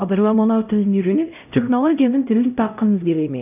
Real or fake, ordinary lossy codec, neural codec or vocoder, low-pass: fake; none; codec, 16 kHz, 0.5 kbps, X-Codec, HuBERT features, trained on LibriSpeech; 3.6 kHz